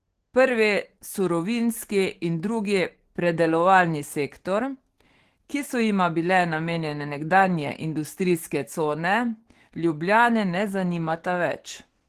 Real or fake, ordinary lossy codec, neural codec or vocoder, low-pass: fake; Opus, 16 kbps; codec, 44.1 kHz, 7.8 kbps, DAC; 14.4 kHz